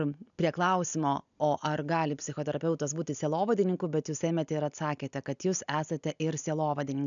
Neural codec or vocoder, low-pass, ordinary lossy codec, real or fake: none; 7.2 kHz; MP3, 96 kbps; real